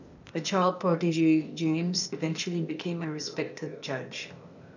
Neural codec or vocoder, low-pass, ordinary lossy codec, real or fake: codec, 16 kHz, 0.8 kbps, ZipCodec; 7.2 kHz; none; fake